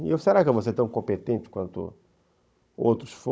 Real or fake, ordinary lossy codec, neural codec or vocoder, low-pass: fake; none; codec, 16 kHz, 16 kbps, FunCodec, trained on Chinese and English, 50 frames a second; none